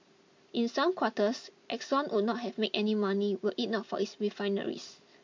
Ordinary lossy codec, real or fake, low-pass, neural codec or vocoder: MP3, 48 kbps; real; 7.2 kHz; none